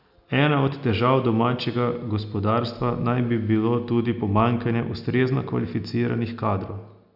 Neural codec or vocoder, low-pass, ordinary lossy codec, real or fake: none; 5.4 kHz; none; real